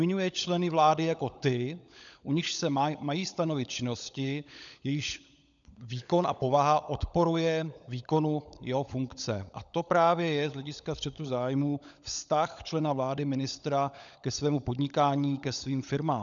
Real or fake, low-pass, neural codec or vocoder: fake; 7.2 kHz; codec, 16 kHz, 16 kbps, FunCodec, trained on LibriTTS, 50 frames a second